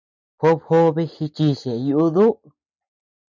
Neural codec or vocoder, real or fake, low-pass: none; real; 7.2 kHz